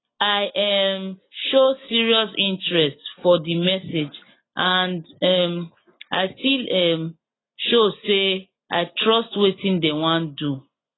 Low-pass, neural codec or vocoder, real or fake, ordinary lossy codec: 7.2 kHz; none; real; AAC, 16 kbps